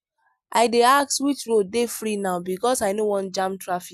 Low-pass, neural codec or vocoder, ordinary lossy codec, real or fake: 14.4 kHz; none; none; real